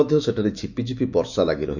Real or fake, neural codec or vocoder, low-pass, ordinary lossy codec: fake; autoencoder, 48 kHz, 128 numbers a frame, DAC-VAE, trained on Japanese speech; 7.2 kHz; none